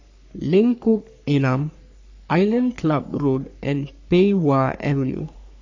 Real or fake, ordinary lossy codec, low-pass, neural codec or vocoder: fake; AAC, 48 kbps; 7.2 kHz; codec, 44.1 kHz, 3.4 kbps, Pupu-Codec